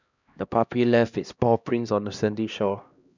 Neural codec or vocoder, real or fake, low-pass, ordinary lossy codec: codec, 16 kHz, 1 kbps, X-Codec, HuBERT features, trained on LibriSpeech; fake; 7.2 kHz; none